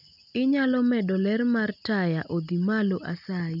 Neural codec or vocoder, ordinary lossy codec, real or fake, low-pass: none; none; real; 5.4 kHz